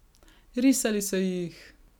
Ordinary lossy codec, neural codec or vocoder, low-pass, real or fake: none; none; none; real